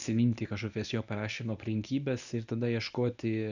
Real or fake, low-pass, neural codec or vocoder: fake; 7.2 kHz; codec, 24 kHz, 0.9 kbps, WavTokenizer, medium speech release version 1